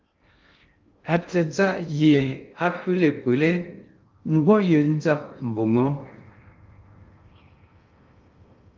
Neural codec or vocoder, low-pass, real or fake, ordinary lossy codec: codec, 16 kHz in and 24 kHz out, 0.6 kbps, FocalCodec, streaming, 2048 codes; 7.2 kHz; fake; Opus, 24 kbps